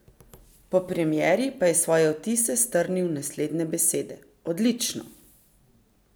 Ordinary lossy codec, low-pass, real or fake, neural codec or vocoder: none; none; real; none